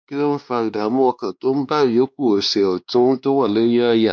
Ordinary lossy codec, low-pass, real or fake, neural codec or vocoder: none; none; fake; codec, 16 kHz, 2 kbps, X-Codec, WavLM features, trained on Multilingual LibriSpeech